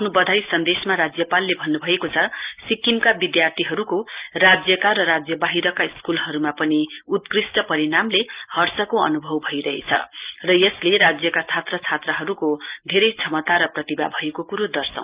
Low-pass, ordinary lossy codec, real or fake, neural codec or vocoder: 3.6 kHz; Opus, 32 kbps; real; none